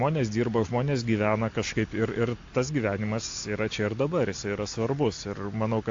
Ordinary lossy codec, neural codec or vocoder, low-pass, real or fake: AAC, 48 kbps; none; 7.2 kHz; real